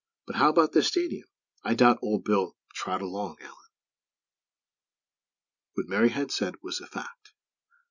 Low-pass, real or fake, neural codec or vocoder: 7.2 kHz; real; none